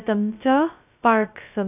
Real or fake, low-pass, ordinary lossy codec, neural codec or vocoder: fake; 3.6 kHz; none; codec, 16 kHz, 0.2 kbps, FocalCodec